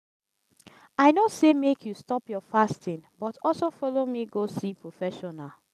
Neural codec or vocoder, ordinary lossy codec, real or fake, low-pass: none; none; real; 14.4 kHz